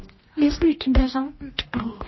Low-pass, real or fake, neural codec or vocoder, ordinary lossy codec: 7.2 kHz; fake; codec, 16 kHz, 1 kbps, X-Codec, HuBERT features, trained on general audio; MP3, 24 kbps